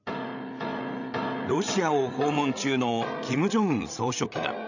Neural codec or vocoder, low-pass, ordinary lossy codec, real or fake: codec, 16 kHz, 16 kbps, FreqCodec, larger model; 7.2 kHz; none; fake